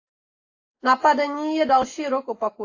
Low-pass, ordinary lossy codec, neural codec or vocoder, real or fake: 7.2 kHz; AAC, 32 kbps; none; real